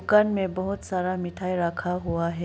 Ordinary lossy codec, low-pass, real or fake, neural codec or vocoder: none; none; real; none